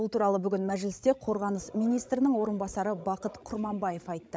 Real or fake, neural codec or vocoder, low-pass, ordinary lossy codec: fake; codec, 16 kHz, 16 kbps, FreqCodec, larger model; none; none